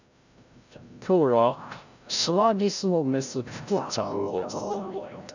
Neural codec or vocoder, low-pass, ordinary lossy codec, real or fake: codec, 16 kHz, 0.5 kbps, FreqCodec, larger model; 7.2 kHz; none; fake